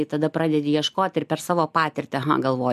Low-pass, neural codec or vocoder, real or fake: 14.4 kHz; none; real